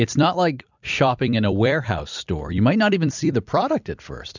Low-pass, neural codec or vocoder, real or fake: 7.2 kHz; vocoder, 44.1 kHz, 128 mel bands every 256 samples, BigVGAN v2; fake